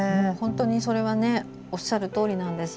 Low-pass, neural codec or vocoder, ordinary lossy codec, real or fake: none; none; none; real